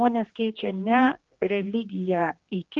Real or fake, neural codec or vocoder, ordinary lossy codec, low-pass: fake; codec, 16 kHz, 2 kbps, X-Codec, HuBERT features, trained on general audio; Opus, 16 kbps; 7.2 kHz